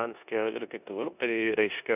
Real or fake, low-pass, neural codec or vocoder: fake; 3.6 kHz; codec, 16 kHz in and 24 kHz out, 0.9 kbps, LongCat-Audio-Codec, four codebook decoder